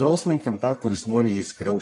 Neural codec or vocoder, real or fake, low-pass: codec, 44.1 kHz, 1.7 kbps, Pupu-Codec; fake; 10.8 kHz